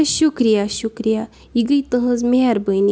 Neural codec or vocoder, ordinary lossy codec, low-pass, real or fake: none; none; none; real